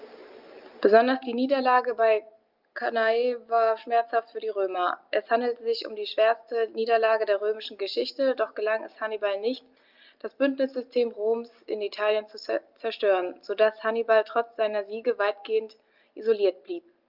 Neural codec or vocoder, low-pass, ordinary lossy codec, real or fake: none; 5.4 kHz; Opus, 24 kbps; real